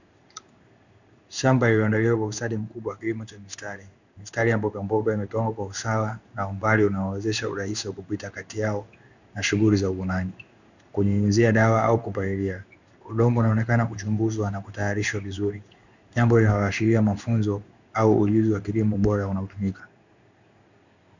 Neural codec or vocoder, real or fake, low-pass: codec, 16 kHz in and 24 kHz out, 1 kbps, XY-Tokenizer; fake; 7.2 kHz